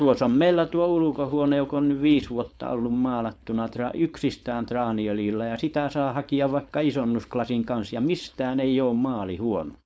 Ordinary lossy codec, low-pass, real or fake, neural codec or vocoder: none; none; fake; codec, 16 kHz, 4.8 kbps, FACodec